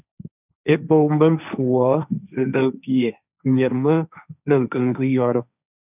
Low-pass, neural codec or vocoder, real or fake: 3.6 kHz; codec, 16 kHz, 1.1 kbps, Voila-Tokenizer; fake